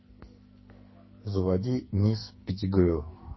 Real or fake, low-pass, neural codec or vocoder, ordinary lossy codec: fake; 7.2 kHz; codec, 44.1 kHz, 2.6 kbps, SNAC; MP3, 24 kbps